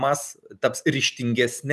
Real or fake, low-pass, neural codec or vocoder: real; 14.4 kHz; none